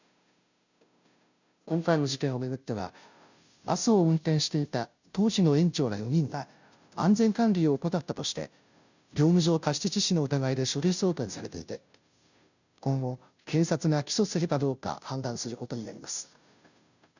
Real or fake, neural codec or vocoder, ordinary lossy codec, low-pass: fake; codec, 16 kHz, 0.5 kbps, FunCodec, trained on Chinese and English, 25 frames a second; none; 7.2 kHz